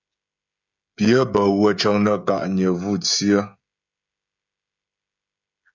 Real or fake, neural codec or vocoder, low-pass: fake; codec, 16 kHz, 16 kbps, FreqCodec, smaller model; 7.2 kHz